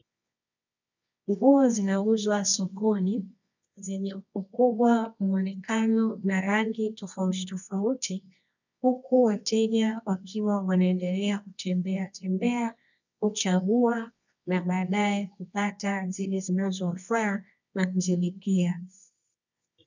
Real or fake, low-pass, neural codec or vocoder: fake; 7.2 kHz; codec, 24 kHz, 0.9 kbps, WavTokenizer, medium music audio release